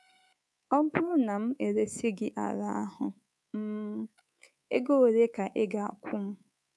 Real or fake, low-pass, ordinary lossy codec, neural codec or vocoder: fake; none; none; codec, 24 kHz, 3.1 kbps, DualCodec